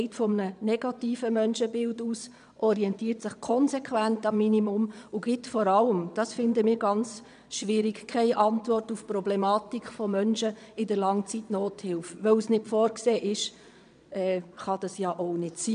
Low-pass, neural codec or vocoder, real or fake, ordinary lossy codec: 9.9 kHz; vocoder, 22.05 kHz, 80 mel bands, Vocos; fake; none